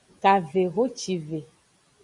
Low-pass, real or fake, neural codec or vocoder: 10.8 kHz; real; none